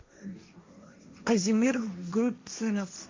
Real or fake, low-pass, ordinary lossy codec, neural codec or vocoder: fake; 7.2 kHz; MP3, 64 kbps; codec, 16 kHz, 1.1 kbps, Voila-Tokenizer